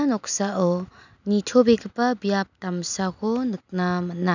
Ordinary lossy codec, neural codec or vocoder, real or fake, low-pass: none; none; real; 7.2 kHz